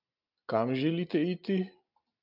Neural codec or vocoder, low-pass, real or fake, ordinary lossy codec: none; 5.4 kHz; real; AAC, 48 kbps